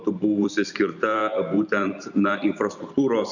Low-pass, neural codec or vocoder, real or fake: 7.2 kHz; vocoder, 44.1 kHz, 128 mel bands every 512 samples, BigVGAN v2; fake